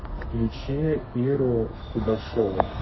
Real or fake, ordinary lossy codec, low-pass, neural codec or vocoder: fake; MP3, 24 kbps; 7.2 kHz; codec, 32 kHz, 1.9 kbps, SNAC